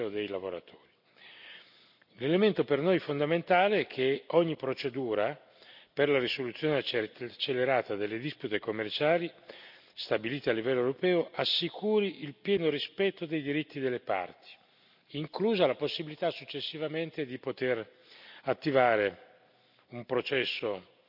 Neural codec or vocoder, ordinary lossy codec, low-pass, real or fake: none; none; 5.4 kHz; real